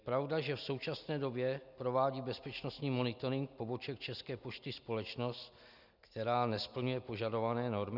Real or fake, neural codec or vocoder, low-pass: real; none; 5.4 kHz